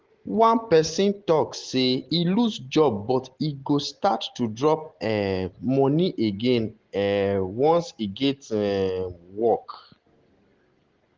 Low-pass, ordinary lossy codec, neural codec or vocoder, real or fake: 7.2 kHz; Opus, 16 kbps; none; real